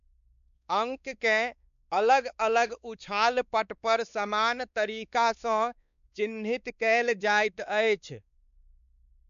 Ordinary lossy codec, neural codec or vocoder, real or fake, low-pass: none; codec, 16 kHz, 2 kbps, X-Codec, WavLM features, trained on Multilingual LibriSpeech; fake; 7.2 kHz